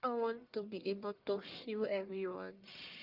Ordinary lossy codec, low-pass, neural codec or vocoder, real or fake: Opus, 24 kbps; 5.4 kHz; codec, 44.1 kHz, 1.7 kbps, Pupu-Codec; fake